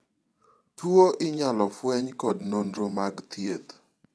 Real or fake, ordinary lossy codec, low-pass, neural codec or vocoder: fake; none; none; vocoder, 22.05 kHz, 80 mel bands, WaveNeXt